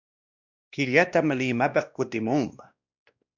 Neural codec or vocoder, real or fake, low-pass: codec, 16 kHz, 1 kbps, X-Codec, WavLM features, trained on Multilingual LibriSpeech; fake; 7.2 kHz